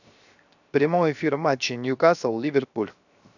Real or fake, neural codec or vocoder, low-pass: fake; codec, 16 kHz, 0.7 kbps, FocalCodec; 7.2 kHz